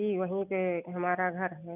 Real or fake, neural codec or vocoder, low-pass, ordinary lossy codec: real; none; 3.6 kHz; none